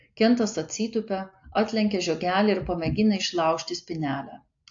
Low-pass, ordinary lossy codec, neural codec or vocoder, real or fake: 7.2 kHz; AAC, 48 kbps; none; real